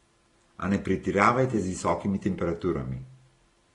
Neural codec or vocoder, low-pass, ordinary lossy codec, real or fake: none; 10.8 kHz; AAC, 32 kbps; real